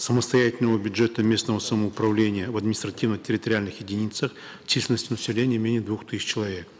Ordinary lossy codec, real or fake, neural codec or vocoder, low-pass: none; real; none; none